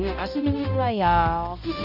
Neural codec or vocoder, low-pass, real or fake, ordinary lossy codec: codec, 16 kHz, 0.5 kbps, X-Codec, HuBERT features, trained on balanced general audio; 5.4 kHz; fake; none